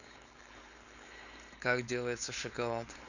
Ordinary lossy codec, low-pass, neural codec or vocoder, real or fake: none; 7.2 kHz; codec, 16 kHz, 4.8 kbps, FACodec; fake